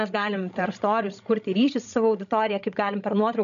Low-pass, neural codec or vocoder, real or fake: 7.2 kHz; codec, 16 kHz, 16 kbps, FreqCodec, larger model; fake